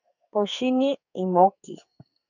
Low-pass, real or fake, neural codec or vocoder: 7.2 kHz; fake; codec, 44.1 kHz, 2.6 kbps, SNAC